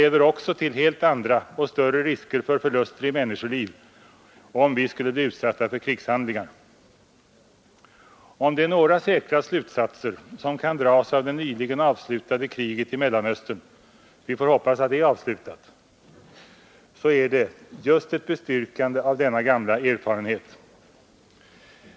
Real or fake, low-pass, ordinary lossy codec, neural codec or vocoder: real; none; none; none